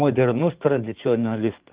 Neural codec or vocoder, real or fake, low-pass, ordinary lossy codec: codec, 16 kHz in and 24 kHz out, 2.2 kbps, FireRedTTS-2 codec; fake; 3.6 kHz; Opus, 32 kbps